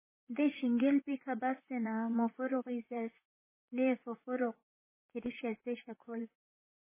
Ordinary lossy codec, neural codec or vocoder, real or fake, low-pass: MP3, 16 kbps; vocoder, 44.1 kHz, 128 mel bands, Pupu-Vocoder; fake; 3.6 kHz